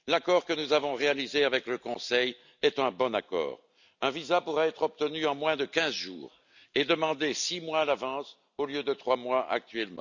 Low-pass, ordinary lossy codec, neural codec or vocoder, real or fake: 7.2 kHz; none; none; real